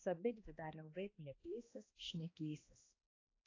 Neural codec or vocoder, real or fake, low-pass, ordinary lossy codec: codec, 16 kHz, 1 kbps, X-Codec, HuBERT features, trained on balanced general audio; fake; 7.2 kHz; AAC, 32 kbps